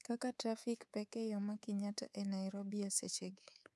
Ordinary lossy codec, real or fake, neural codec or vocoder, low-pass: none; fake; codec, 24 kHz, 3.1 kbps, DualCodec; none